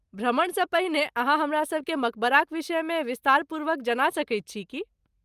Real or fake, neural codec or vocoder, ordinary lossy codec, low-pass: real; none; Opus, 32 kbps; 14.4 kHz